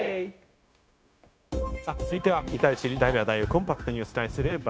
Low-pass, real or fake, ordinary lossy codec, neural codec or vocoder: none; fake; none; codec, 16 kHz, 0.9 kbps, LongCat-Audio-Codec